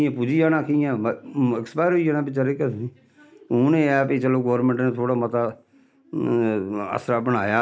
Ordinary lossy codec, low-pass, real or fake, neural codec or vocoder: none; none; real; none